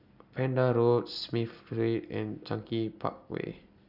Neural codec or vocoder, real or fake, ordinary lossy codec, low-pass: none; real; none; 5.4 kHz